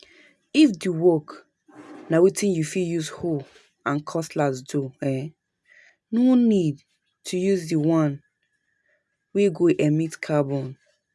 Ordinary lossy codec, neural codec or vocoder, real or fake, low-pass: none; none; real; none